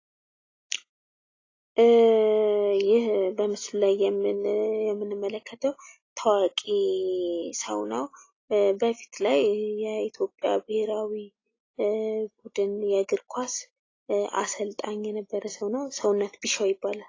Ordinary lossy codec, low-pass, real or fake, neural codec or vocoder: AAC, 32 kbps; 7.2 kHz; real; none